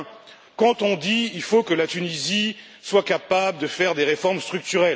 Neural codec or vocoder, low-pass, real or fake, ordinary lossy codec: none; none; real; none